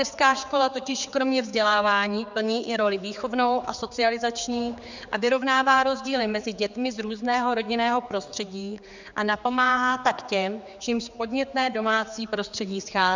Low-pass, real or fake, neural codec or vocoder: 7.2 kHz; fake; codec, 16 kHz, 4 kbps, X-Codec, HuBERT features, trained on general audio